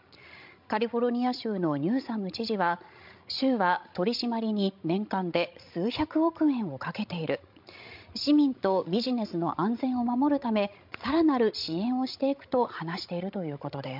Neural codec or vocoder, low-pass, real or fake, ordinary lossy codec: codec, 16 kHz, 16 kbps, FreqCodec, larger model; 5.4 kHz; fake; MP3, 48 kbps